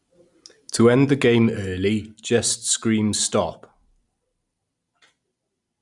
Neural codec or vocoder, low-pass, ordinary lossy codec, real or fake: vocoder, 44.1 kHz, 128 mel bands every 256 samples, BigVGAN v2; 10.8 kHz; Opus, 64 kbps; fake